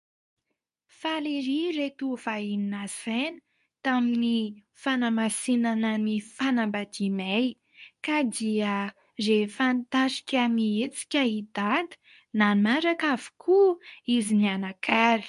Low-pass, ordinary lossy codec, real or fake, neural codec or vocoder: 10.8 kHz; MP3, 64 kbps; fake; codec, 24 kHz, 0.9 kbps, WavTokenizer, medium speech release version 2